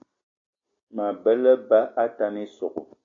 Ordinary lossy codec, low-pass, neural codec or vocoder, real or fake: AAC, 32 kbps; 7.2 kHz; none; real